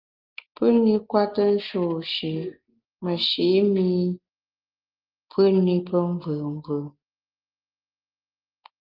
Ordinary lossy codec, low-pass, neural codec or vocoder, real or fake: Opus, 32 kbps; 5.4 kHz; none; real